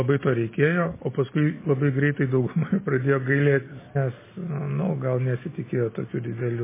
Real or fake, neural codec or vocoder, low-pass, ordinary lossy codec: real; none; 3.6 kHz; MP3, 16 kbps